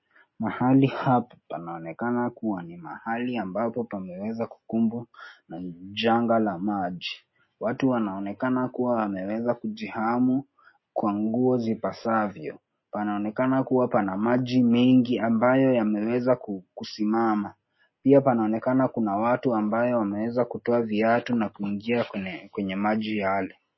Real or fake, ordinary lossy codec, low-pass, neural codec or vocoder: real; MP3, 24 kbps; 7.2 kHz; none